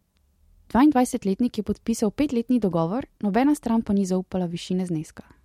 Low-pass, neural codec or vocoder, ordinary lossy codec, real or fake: 19.8 kHz; none; MP3, 64 kbps; real